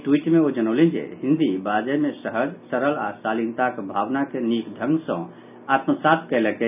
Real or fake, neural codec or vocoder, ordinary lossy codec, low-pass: real; none; none; 3.6 kHz